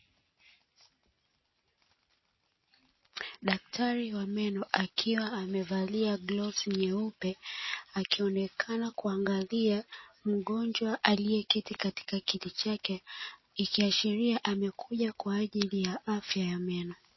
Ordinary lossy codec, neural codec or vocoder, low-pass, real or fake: MP3, 24 kbps; none; 7.2 kHz; real